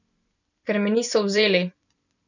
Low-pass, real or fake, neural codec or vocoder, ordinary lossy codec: 7.2 kHz; fake; vocoder, 44.1 kHz, 128 mel bands every 256 samples, BigVGAN v2; none